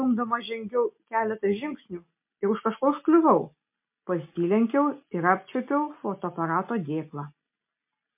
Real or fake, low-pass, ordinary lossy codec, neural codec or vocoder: real; 3.6 kHz; MP3, 32 kbps; none